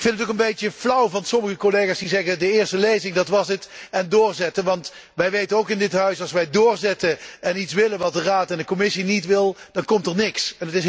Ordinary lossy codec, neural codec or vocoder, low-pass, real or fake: none; none; none; real